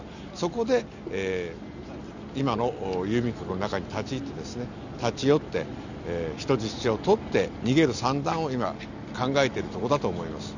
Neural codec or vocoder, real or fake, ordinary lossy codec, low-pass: none; real; none; 7.2 kHz